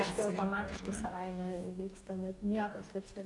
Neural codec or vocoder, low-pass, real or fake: codec, 44.1 kHz, 2.6 kbps, DAC; 10.8 kHz; fake